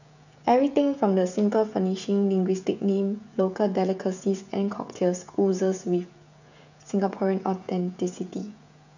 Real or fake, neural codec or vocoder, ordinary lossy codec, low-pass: fake; codec, 16 kHz, 16 kbps, FreqCodec, smaller model; none; 7.2 kHz